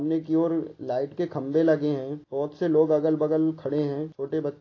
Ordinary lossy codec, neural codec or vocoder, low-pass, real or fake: AAC, 32 kbps; none; 7.2 kHz; real